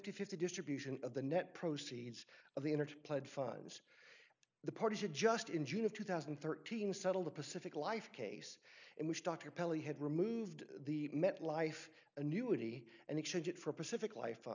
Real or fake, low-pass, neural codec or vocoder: real; 7.2 kHz; none